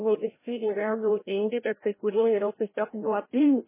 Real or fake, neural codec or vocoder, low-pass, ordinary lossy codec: fake; codec, 16 kHz, 0.5 kbps, FreqCodec, larger model; 3.6 kHz; MP3, 16 kbps